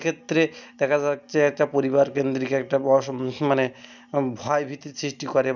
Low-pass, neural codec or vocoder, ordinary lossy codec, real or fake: 7.2 kHz; none; none; real